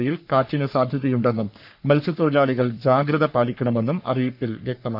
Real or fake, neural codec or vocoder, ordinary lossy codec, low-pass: fake; codec, 44.1 kHz, 3.4 kbps, Pupu-Codec; none; 5.4 kHz